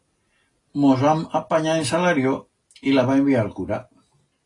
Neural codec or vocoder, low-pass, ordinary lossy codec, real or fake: none; 10.8 kHz; AAC, 32 kbps; real